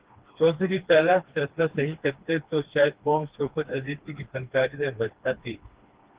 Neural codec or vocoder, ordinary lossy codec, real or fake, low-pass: codec, 16 kHz, 2 kbps, FreqCodec, smaller model; Opus, 16 kbps; fake; 3.6 kHz